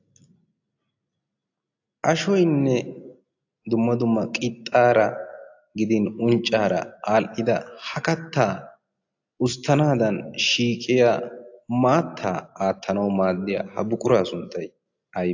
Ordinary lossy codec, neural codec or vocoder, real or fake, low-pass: AAC, 48 kbps; none; real; 7.2 kHz